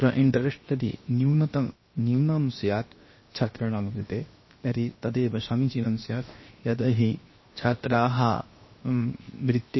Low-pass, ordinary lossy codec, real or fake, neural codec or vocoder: 7.2 kHz; MP3, 24 kbps; fake; codec, 16 kHz, 0.7 kbps, FocalCodec